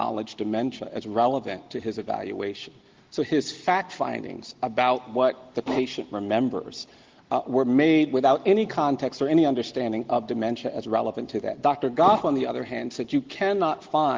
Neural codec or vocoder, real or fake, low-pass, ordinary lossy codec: none; real; 7.2 kHz; Opus, 16 kbps